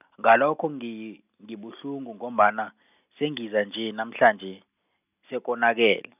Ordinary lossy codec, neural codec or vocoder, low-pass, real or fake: none; none; 3.6 kHz; real